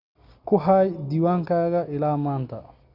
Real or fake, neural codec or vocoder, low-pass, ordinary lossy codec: real; none; 5.4 kHz; Opus, 64 kbps